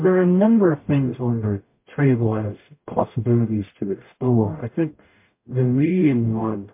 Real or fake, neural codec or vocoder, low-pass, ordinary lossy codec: fake; codec, 44.1 kHz, 0.9 kbps, DAC; 3.6 kHz; MP3, 24 kbps